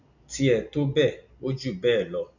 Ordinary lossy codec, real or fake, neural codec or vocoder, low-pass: none; real; none; 7.2 kHz